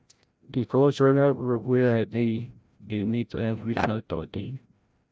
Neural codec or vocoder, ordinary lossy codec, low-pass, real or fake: codec, 16 kHz, 0.5 kbps, FreqCodec, larger model; none; none; fake